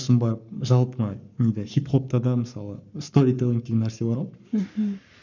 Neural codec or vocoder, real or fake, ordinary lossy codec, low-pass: codec, 44.1 kHz, 7.8 kbps, Pupu-Codec; fake; none; 7.2 kHz